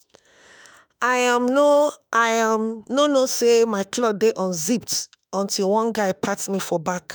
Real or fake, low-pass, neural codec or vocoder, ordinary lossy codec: fake; none; autoencoder, 48 kHz, 32 numbers a frame, DAC-VAE, trained on Japanese speech; none